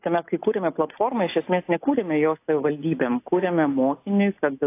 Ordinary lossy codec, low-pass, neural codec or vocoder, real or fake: AAC, 24 kbps; 3.6 kHz; none; real